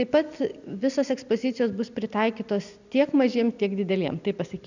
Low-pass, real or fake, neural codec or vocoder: 7.2 kHz; real; none